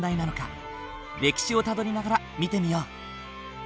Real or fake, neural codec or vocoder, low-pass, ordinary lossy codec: real; none; none; none